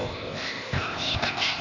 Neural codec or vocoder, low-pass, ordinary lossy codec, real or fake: codec, 16 kHz, 0.8 kbps, ZipCodec; 7.2 kHz; none; fake